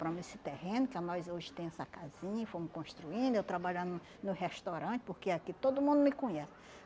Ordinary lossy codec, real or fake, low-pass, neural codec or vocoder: none; real; none; none